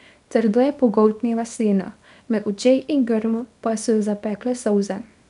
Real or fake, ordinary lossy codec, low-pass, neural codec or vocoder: fake; none; 10.8 kHz; codec, 24 kHz, 0.9 kbps, WavTokenizer, small release